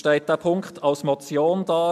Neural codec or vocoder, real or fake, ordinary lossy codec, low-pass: none; real; MP3, 96 kbps; 14.4 kHz